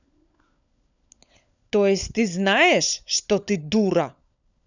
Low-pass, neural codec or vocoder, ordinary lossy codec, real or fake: 7.2 kHz; codec, 16 kHz, 16 kbps, FunCodec, trained on LibriTTS, 50 frames a second; none; fake